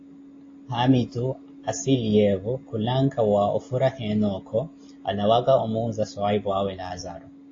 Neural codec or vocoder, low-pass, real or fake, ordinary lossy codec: none; 7.2 kHz; real; AAC, 32 kbps